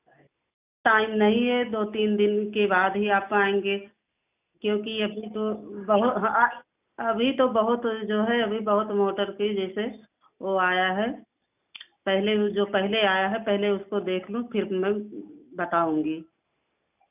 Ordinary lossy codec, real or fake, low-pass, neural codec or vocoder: none; real; 3.6 kHz; none